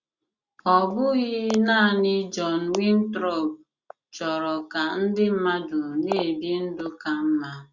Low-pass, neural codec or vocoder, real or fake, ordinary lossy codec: 7.2 kHz; none; real; Opus, 64 kbps